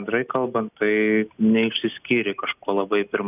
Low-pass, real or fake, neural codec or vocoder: 3.6 kHz; real; none